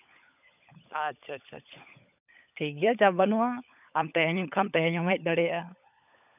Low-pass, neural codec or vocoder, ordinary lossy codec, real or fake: 3.6 kHz; codec, 16 kHz, 16 kbps, FunCodec, trained on LibriTTS, 50 frames a second; none; fake